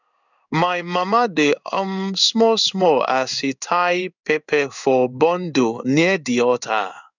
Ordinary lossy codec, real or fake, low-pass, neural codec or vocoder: none; fake; 7.2 kHz; codec, 16 kHz in and 24 kHz out, 1 kbps, XY-Tokenizer